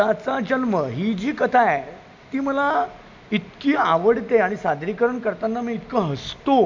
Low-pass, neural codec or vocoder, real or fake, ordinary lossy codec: 7.2 kHz; none; real; MP3, 64 kbps